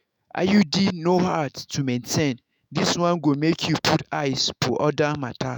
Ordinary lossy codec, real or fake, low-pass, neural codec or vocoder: none; fake; none; autoencoder, 48 kHz, 128 numbers a frame, DAC-VAE, trained on Japanese speech